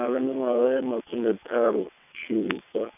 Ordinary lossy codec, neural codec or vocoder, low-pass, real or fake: none; vocoder, 22.05 kHz, 80 mel bands, WaveNeXt; 3.6 kHz; fake